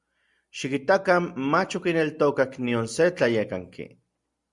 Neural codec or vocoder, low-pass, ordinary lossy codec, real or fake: none; 10.8 kHz; Opus, 64 kbps; real